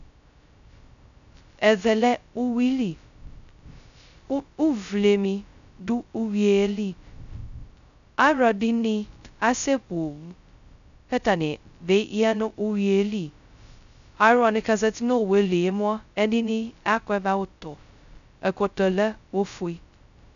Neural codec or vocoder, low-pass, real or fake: codec, 16 kHz, 0.2 kbps, FocalCodec; 7.2 kHz; fake